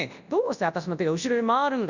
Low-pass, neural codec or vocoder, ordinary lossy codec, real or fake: 7.2 kHz; codec, 24 kHz, 0.9 kbps, WavTokenizer, large speech release; none; fake